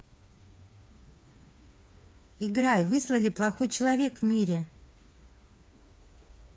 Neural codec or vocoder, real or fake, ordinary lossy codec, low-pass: codec, 16 kHz, 4 kbps, FreqCodec, smaller model; fake; none; none